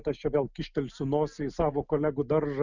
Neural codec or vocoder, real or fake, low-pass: none; real; 7.2 kHz